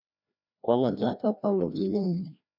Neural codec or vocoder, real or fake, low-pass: codec, 16 kHz, 1 kbps, FreqCodec, larger model; fake; 5.4 kHz